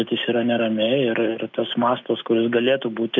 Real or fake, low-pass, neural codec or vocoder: real; 7.2 kHz; none